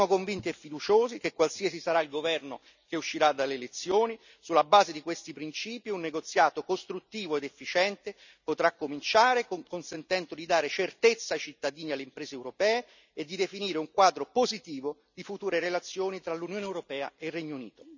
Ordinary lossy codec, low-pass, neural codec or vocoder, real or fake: none; 7.2 kHz; none; real